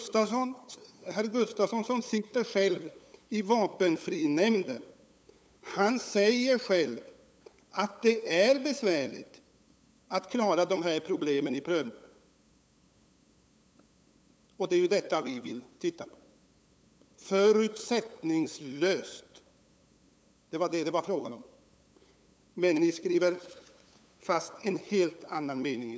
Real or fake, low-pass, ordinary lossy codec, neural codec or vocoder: fake; none; none; codec, 16 kHz, 8 kbps, FunCodec, trained on LibriTTS, 25 frames a second